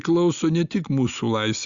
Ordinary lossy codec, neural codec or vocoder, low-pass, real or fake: Opus, 64 kbps; none; 7.2 kHz; real